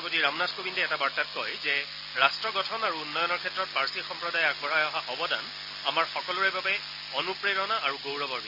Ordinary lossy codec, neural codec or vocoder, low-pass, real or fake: none; none; 5.4 kHz; real